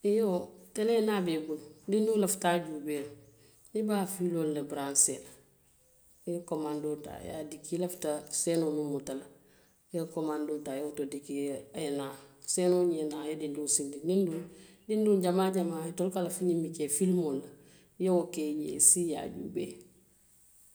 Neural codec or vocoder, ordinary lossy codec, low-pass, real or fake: none; none; none; real